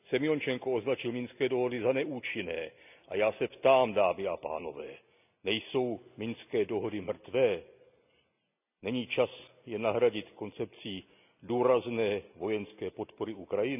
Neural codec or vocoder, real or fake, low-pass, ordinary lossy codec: none; real; 3.6 kHz; none